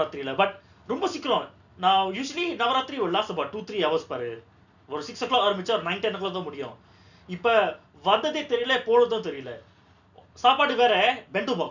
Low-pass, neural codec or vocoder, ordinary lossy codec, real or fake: 7.2 kHz; none; none; real